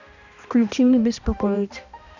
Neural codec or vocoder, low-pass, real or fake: codec, 16 kHz, 1 kbps, X-Codec, HuBERT features, trained on balanced general audio; 7.2 kHz; fake